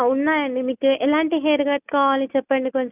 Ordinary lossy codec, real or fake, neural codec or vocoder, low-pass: none; real; none; 3.6 kHz